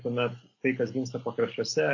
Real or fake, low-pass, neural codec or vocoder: real; 7.2 kHz; none